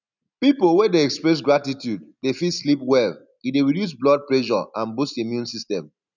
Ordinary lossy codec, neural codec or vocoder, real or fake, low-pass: none; none; real; 7.2 kHz